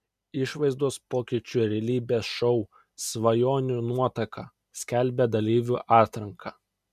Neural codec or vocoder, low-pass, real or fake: none; 14.4 kHz; real